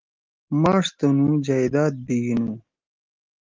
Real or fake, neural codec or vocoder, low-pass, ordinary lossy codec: real; none; 7.2 kHz; Opus, 32 kbps